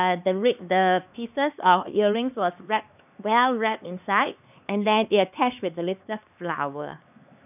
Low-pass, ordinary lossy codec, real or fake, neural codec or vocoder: 3.6 kHz; none; fake; codec, 16 kHz, 2 kbps, X-Codec, HuBERT features, trained on LibriSpeech